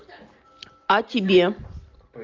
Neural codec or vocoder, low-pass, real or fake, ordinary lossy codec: none; 7.2 kHz; real; Opus, 24 kbps